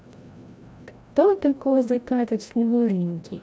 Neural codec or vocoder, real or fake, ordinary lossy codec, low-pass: codec, 16 kHz, 0.5 kbps, FreqCodec, larger model; fake; none; none